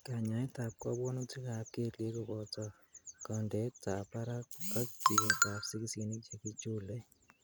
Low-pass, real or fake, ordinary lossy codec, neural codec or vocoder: none; real; none; none